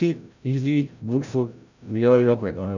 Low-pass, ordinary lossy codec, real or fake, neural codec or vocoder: 7.2 kHz; none; fake; codec, 16 kHz, 0.5 kbps, FreqCodec, larger model